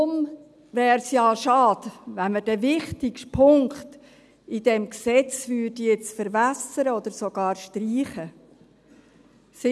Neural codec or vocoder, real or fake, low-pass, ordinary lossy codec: none; real; none; none